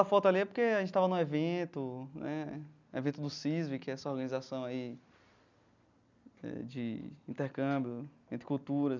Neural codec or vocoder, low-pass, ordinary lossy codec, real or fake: none; 7.2 kHz; none; real